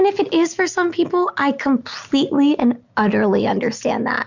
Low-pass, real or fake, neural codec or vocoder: 7.2 kHz; real; none